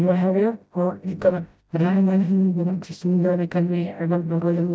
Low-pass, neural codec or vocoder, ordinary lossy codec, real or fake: none; codec, 16 kHz, 0.5 kbps, FreqCodec, smaller model; none; fake